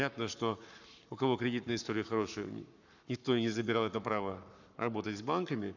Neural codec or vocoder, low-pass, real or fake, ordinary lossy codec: codec, 44.1 kHz, 7.8 kbps, Pupu-Codec; 7.2 kHz; fake; none